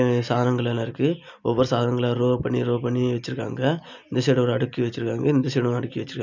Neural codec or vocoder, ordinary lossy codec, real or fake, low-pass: none; none; real; 7.2 kHz